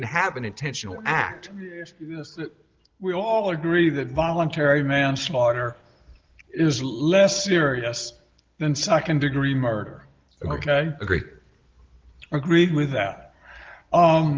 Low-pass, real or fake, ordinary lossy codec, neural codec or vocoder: 7.2 kHz; real; Opus, 16 kbps; none